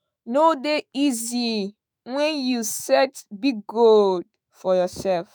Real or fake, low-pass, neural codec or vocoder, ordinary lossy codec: fake; none; autoencoder, 48 kHz, 128 numbers a frame, DAC-VAE, trained on Japanese speech; none